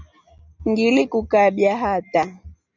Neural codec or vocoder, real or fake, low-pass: none; real; 7.2 kHz